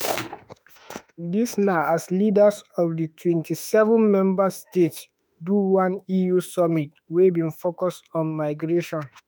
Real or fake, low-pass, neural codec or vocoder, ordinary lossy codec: fake; none; autoencoder, 48 kHz, 32 numbers a frame, DAC-VAE, trained on Japanese speech; none